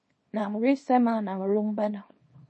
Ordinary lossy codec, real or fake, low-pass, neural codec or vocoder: MP3, 32 kbps; fake; 10.8 kHz; codec, 24 kHz, 0.9 kbps, WavTokenizer, small release